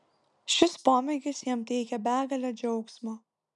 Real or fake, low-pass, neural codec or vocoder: real; 10.8 kHz; none